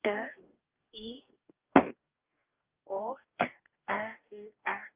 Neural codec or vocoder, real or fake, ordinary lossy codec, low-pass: codec, 44.1 kHz, 2.6 kbps, DAC; fake; Opus, 24 kbps; 3.6 kHz